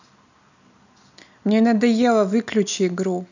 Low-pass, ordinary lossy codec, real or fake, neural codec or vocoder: 7.2 kHz; none; real; none